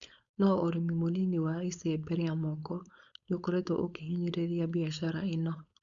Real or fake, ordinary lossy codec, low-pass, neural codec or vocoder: fake; Opus, 64 kbps; 7.2 kHz; codec, 16 kHz, 4.8 kbps, FACodec